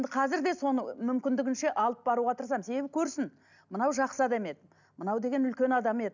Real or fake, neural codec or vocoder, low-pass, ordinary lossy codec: real; none; 7.2 kHz; none